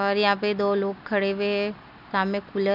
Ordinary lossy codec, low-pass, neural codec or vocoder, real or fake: none; 5.4 kHz; none; real